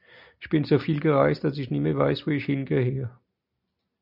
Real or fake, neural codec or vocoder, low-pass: real; none; 5.4 kHz